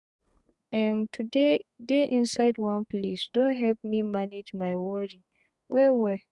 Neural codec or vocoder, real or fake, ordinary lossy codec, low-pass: codec, 32 kHz, 1.9 kbps, SNAC; fake; Opus, 64 kbps; 10.8 kHz